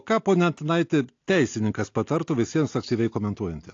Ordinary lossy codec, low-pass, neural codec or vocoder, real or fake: AAC, 48 kbps; 7.2 kHz; none; real